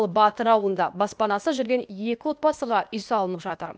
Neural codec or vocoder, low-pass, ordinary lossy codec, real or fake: codec, 16 kHz, 0.8 kbps, ZipCodec; none; none; fake